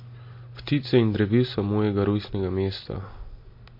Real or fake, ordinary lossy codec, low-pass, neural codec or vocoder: real; MP3, 32 kbps; 5.4 kHz; none